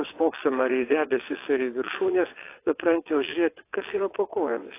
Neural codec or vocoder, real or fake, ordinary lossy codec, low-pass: vocoder, 22.05 kHz, 80 mel bands, WaveNeXt; fake; AAC, 24 kbps; 3.6 kHz